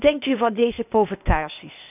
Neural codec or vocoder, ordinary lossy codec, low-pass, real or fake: codec, 16 kHz, 0.8 kbps, ZipCodec; none; 3.6 kHz; fake